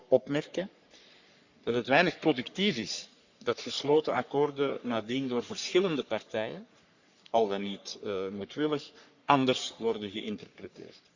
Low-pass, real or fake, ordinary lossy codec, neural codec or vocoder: 7.2 kHz; fake; Opus, 64 kbps; codec, 44.1 kHz, 3.4 kbps, Pupu-Codec